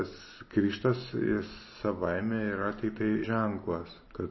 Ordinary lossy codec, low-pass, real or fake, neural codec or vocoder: MP3, 24 kbps; 7.2 kHz; real; none